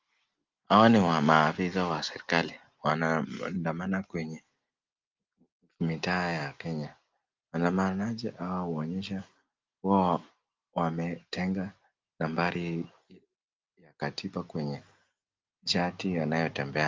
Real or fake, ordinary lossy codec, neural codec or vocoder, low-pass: real; Opus, 32 kbps; none; 7.2 kHz